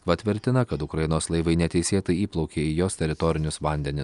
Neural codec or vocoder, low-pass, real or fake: none; 10.8 kHz; real